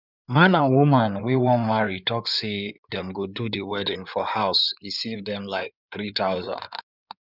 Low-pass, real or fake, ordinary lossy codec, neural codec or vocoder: 5.4 kHz; fake; none; codec, 16 kHz in and 24 kHz out, 2.2 kbps, FireRedTTS-2 codec